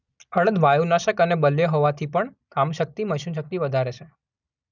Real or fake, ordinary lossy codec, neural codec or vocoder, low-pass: real; none; none; 7.2 kHz